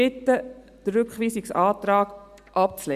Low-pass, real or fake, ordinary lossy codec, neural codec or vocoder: 14.4 kHz; real; Opus, 64 kbps; none